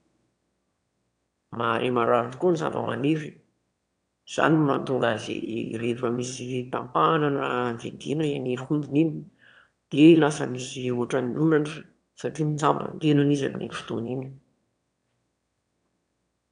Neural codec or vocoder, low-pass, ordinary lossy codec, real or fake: autoencoder, 22.05 kHz, a latent of 192 numbers a frame, VITS, trained on one speaker; 9.9 kHz; AAC, 64 kbps; fake